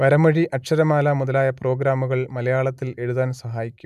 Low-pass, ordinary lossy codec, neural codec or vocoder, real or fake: 14.4 kHz; none; none; real